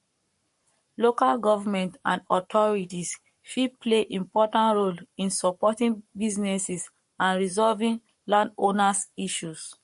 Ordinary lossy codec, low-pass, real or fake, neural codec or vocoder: MP3, 48 kbps; 14.4 kHz; fake; codec, 44.1 kHz, 7.8 kbps, Pupu-Codec